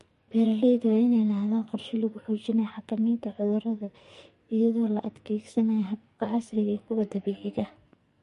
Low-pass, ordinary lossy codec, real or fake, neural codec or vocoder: 14.4 kHz; MP3, 48 kbps; fake; codec, 44.1 kHz, 2.6 kbps, SNAC